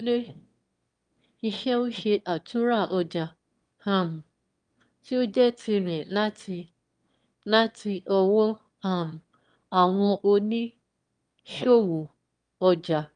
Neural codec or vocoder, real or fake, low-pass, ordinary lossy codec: autoencoder, 22.05 kHz, a latent of 192 numbers a frame, VITS, trained on one speaker; fake; 9.9 kHz; Opus, 32 kbps